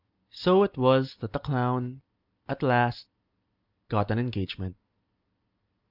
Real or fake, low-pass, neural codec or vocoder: real; 5.4 kHz; none